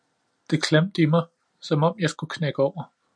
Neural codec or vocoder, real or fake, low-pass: none; real; 9.9 kHz